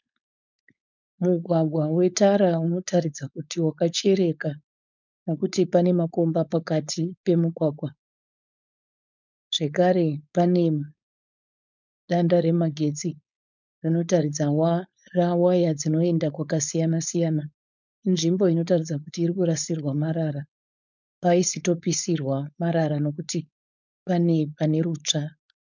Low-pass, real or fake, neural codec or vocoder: 7.2 kHz; fake; codec, 16 kHz, 4.8 kbps, FACodec